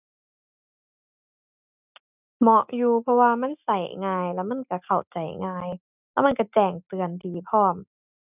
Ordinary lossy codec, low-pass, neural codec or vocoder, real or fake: none; 3.6 kHz; none; real